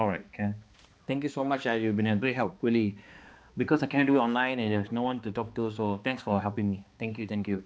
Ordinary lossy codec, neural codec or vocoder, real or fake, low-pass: none; codec, 16 kHz, 2 kbps, X-Codec, HuBERT features, trained on balanced general audio; fake; none